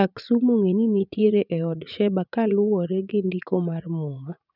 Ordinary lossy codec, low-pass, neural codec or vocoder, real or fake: none; 5.4 kHz; vocoder, 44.1 kHz, 80 mel bands, Vocos; fake